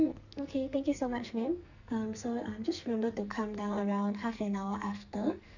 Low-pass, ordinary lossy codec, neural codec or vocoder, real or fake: 7.2 kHz; none; codec, 44.1 kHz, 2.6 kbps, SNAC; fake